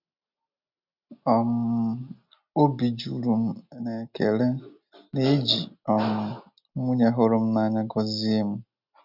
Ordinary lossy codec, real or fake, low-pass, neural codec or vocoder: none; real; 5.4 kHz; none